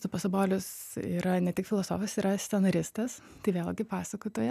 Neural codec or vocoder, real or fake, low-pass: none; real; 14.4 kHz